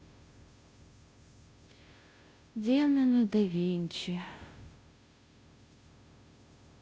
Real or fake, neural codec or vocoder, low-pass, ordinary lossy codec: fake; codec, 16 kHz, 0.5 kbps, FunCodec, trained on Chinese and English, 25 frames a second; none; none